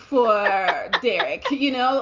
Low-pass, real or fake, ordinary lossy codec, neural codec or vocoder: 7.2 kHz; real; Opus, 32 kbps; none